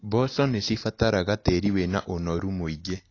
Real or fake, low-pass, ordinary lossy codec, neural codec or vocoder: real; 7.2 kHz; AAC, 32 kbps; none